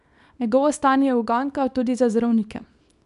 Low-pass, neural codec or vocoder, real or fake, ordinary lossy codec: 10.8 kHz; codec, 24 kHz, 0.9 kbps, WavTokenizer, small release; fake; none